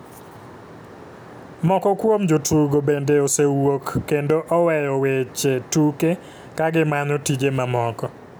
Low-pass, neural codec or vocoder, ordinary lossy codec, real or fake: none; none; none; real